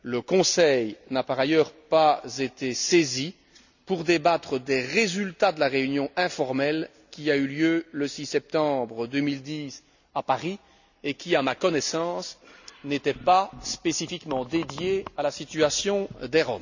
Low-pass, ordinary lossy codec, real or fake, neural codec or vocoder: 7.2 kHz; none; real; none